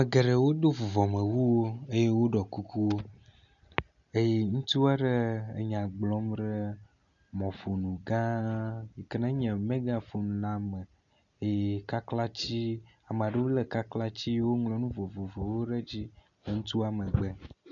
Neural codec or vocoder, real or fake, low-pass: none; real; 7.2 kHz